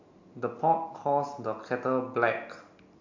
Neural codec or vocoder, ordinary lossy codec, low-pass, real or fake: none; AAC, 48 kbps; 7.2 kHz; real